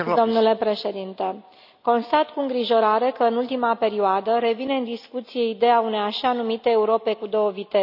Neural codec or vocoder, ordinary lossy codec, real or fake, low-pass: none; none; real; 5.4 kHz